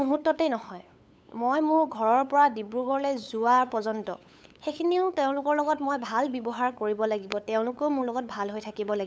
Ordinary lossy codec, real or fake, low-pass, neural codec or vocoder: none; fake; none; codec, 16 kHz, 8 kbps, FunCodec, trained on LibriTTS, 25 frames a second